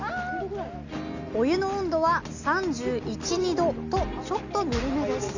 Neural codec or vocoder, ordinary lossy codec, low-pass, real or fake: none; none; 7.2 kHz; real